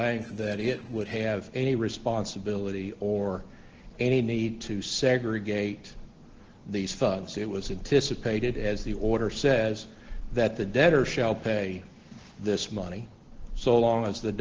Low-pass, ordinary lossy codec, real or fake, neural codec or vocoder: 7.2 kHz; Opus, 16 kbps; real; none